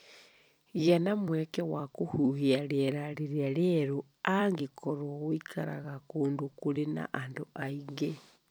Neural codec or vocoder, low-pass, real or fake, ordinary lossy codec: vocoder, 44.1 kHz, 128 mel bands every 256 samples, BigVGAN v2; none; fake; none